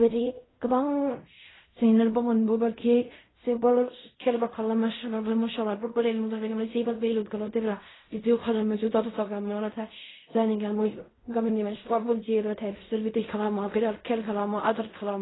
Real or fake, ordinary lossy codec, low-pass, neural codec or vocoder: fake; AAC, 16 kbps; 7.2 kHz; codec, 16 kHz in and 24 kHz out, 0.4 kbps, LongCat-Audio-Codec, fine tuned four codebook decoder